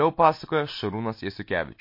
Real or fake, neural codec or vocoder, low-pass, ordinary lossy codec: real; none; 5.4 kHz; MP3, 32 kbps